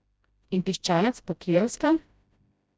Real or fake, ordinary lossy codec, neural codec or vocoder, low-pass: fake; none; codec, 16 kHz, 0.5 kbps, FreqCodec, smaller model; none